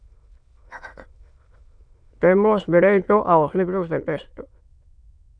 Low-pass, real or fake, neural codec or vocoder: 9.9 kHz; fake; autoencoder, 22.05 kHz, a latent of 192 numbers a frame, VITS, trained on many speakers